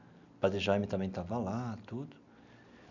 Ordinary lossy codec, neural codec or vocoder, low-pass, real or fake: none; none; 7.2 kHz; real